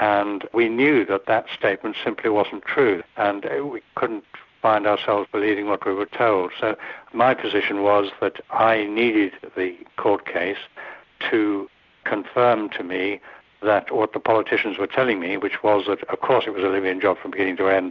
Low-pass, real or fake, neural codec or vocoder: 7.2 kHz; real; none